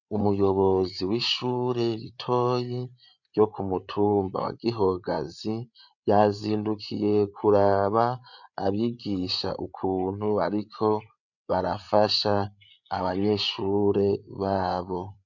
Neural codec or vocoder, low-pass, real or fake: codec, 16 kHz, 8 kbps, FreqCodec, larger model; 7.2 kHz; fake